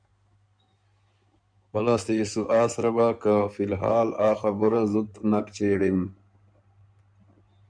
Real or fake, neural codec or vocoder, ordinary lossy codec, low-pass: fake; codec, 16 kHz in and 24 kHz out, 2.2 kbps, FireRedTTS-2 codec; MP3, 96 kbps; 9.9 kHz